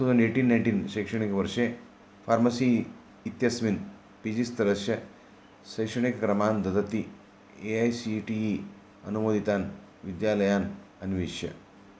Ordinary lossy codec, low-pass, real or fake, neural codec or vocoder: none; none; real; none